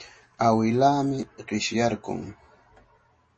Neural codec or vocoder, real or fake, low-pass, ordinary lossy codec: none; real; 10.8 kHz; MP3, 32 kbps